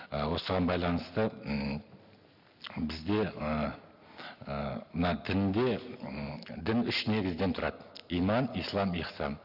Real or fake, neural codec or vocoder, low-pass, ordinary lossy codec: real; none; 5.4 kHz; none